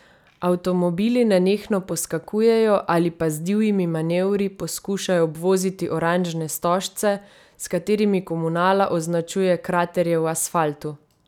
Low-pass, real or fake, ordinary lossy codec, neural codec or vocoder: 19.8 kHz; real; none; none